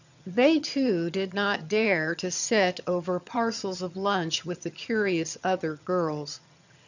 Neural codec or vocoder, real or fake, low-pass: vocoder, 22.05 kHz, 80 mel bands, HiFi-GAN; fake; 7.2 kHz